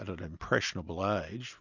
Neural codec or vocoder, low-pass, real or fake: none; 7.2 kHz; real